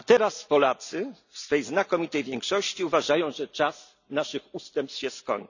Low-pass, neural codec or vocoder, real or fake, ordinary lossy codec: 7.2 kHz; none; real; none